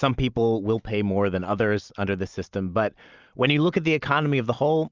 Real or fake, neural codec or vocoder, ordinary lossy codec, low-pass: real; none; Opus, 24 kbps; 7.2 kHz